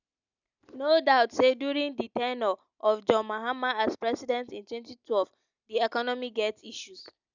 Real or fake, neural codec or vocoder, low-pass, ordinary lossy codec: real; none; 7.2 kHz; none